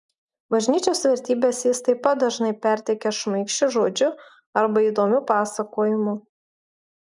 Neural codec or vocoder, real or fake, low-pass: none; real; 10.8 kHz